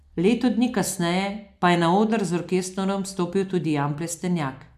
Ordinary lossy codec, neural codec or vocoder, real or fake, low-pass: AAC, 96 kbps; none; real; 14.4 kHz